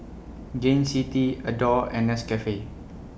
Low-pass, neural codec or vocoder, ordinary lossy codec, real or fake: none; none; none; real